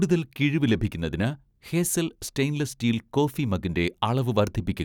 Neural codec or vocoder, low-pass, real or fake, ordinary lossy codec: none; 19.8 kHz; real; none